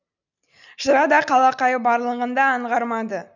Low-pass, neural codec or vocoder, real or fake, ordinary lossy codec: 7.2 kHz; none; real; none